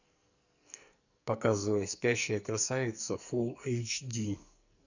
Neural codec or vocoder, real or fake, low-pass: codec, 44.1 kHz, 2.6 kbps, SNAC; fake; 7.2 kHz